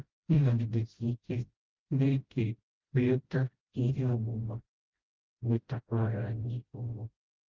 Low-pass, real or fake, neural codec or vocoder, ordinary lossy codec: 7.2 kHz; fake; codec, 16 kHz, 0.5 kbps, FreqCodec, smaller model; Opus, 32 kbps